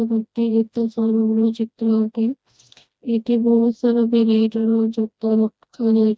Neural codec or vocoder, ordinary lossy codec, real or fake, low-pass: codec, 16 kHz, 1 kbps, FreqCodec, smaller model; none; fake; none